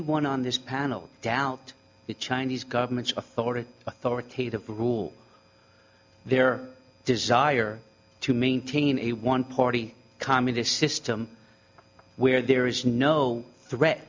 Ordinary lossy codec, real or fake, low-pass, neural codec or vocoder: MP3, 64 kbps; real; 7.2 kHz; none